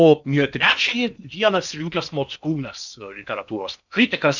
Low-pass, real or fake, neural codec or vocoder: 7.2 kHz; fake; codec, 16 kHz in and 24 kHz out, 0.8 kbps, FocalCodec, streaming, 65536 codes